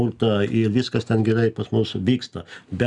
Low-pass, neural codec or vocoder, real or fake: 10.8 kHz; none; real